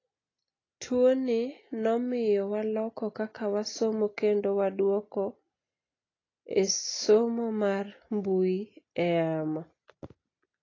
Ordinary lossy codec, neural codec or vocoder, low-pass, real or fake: AAC, 32 kbps; none; 7.2 kHz; real